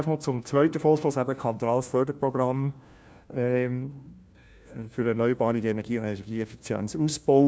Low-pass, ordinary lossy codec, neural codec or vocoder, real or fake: none; none; codec, 16 kHz, 1 kbps, FunCodec, trained on LibriTTS, 50 frames a second; fake